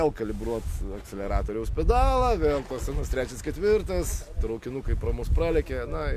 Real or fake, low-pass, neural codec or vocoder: real; 14.4 kHz; none